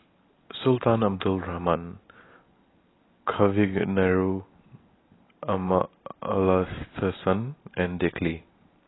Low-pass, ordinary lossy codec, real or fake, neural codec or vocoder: 7.2 kHz; AAC, 16 kbps; real; none